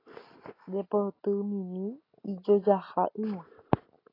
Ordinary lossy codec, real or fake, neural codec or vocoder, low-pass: AAC, 24 kbps; real; none; 5.4 kHz